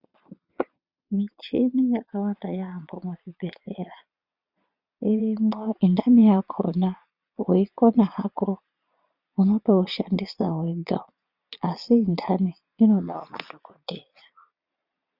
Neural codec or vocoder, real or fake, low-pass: vocoder, 22.05 kHz, 80 mel bands, Vocos; fake; 5.4 kHz